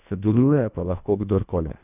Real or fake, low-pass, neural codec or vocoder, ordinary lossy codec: fake; 3.6 kHz; codec, 24 kHz, 1.5 kbps, HILCodec; none